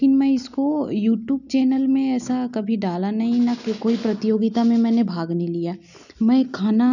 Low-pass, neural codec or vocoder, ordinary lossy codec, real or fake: 7.2 kHz; none; none; real